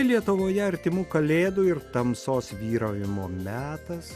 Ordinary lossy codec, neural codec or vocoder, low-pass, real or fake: Opus, 64 kbps; none; 14.4 kHz; real